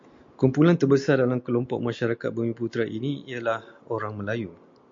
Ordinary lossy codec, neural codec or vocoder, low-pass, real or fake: MP3, 48 kbps; none; 7.2 kHz; real